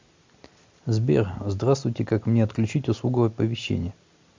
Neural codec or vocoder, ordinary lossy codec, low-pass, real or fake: none; MP3, 64 kbps; 7.2 kHz; real